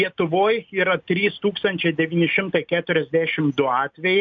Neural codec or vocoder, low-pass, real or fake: none; 7.2 kHz; real